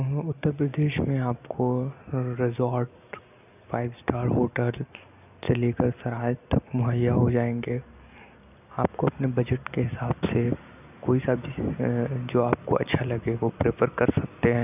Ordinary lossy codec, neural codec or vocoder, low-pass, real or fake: none; none; 3.6 kHz; real